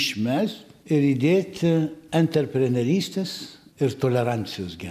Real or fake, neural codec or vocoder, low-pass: real; none; 14.4 kHz